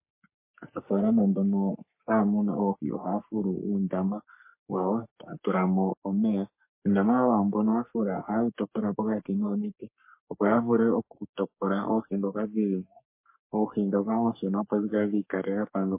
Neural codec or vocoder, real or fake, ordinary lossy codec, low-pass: codec, 44.1 kHz, 3.4 kbps, Pupu-Codec; fake; MP3, 24 kbps; 3.6 kHz